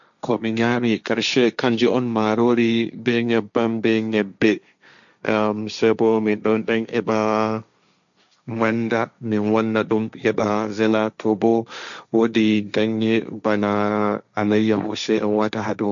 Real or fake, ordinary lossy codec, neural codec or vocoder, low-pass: fake; MP3, 96 kbps; codec, 16 kHz, 1.1 kbps, Voila-Tokenizer; 7.2 kHz